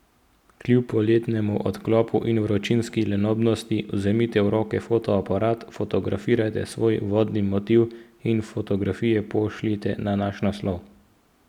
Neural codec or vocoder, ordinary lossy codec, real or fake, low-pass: none; none; real; 19.8 kHz